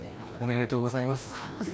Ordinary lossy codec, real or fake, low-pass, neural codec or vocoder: none; fake; none; codec, 16 kHz, 1 kbps, FreqCodec, larger model